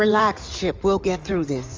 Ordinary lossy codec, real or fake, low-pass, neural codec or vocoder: Opus, 32 kbps; fake; 7.2 kHz; codec, 16 kHz in and 24 kHz out, 2.2 kbps, FireRedTTS-2 codec